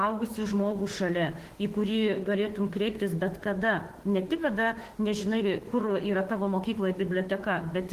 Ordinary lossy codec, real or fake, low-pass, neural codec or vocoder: Opus, 16 kbps; fake; 14.4 kHz; autoencoder, 48 kHz, 32 numbers a frame, DAC-VAE, trained on Japanese speech